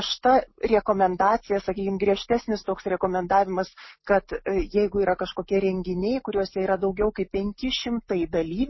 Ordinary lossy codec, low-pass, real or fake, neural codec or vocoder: MP3, 24 kbps; 7.2 kHz; real; none